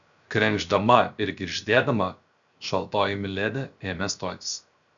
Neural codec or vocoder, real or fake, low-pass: codec, 16 kHz, 0.7 kbps, FocalCodec; fake; 7.2 kHz